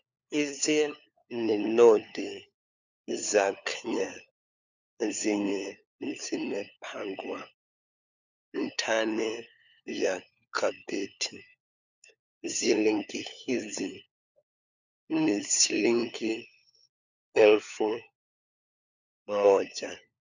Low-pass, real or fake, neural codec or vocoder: 7.2 kHz; fake; codec, 16 kHz, 4 kbps, FunCodec, trained on LibriTTS, 50 frames a second